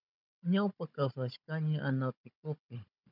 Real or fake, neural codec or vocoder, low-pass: fake; codec, 16 kHz, 16 kbps, FunCodec, trained on Chinese and English, 50 frames a second; 5.4 kHz